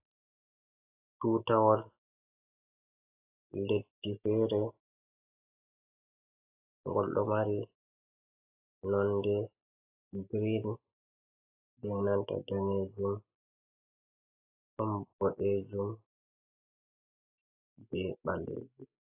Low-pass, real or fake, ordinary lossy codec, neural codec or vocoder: 3.6 kHz; real; AAC, 24 kbps; none